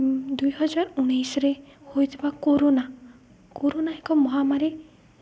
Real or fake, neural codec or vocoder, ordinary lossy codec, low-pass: real; none; none; none